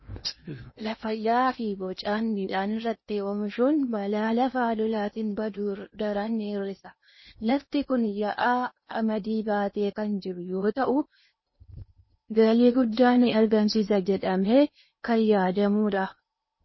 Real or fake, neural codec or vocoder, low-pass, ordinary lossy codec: fake; codec, 16 kHz in and 24 kHz out, 0.8 kbps, FocalCodec, streaming, 65536 codes; 7.2 kHz; MP3, 24 kbps